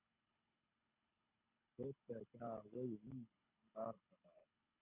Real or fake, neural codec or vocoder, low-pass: fake; codec, 24 kHz, 6 kbps, HILCodec; 3.6 kHz